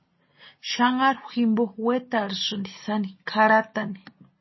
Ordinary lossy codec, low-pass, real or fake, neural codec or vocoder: MP3, 24 kbps; 7.2 kHz; real; none